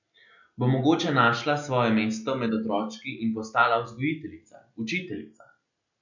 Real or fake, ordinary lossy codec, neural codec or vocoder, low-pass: real; none; none; 7.2 kHz